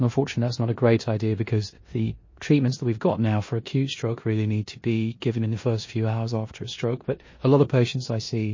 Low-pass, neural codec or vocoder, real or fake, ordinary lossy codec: 7.2 kHz; codec, 16 kHz in and 24 kHz out, 0.9 kbps, LongCat-Audio-Codec, four codebook decoder; fake; MP3, 32 kbps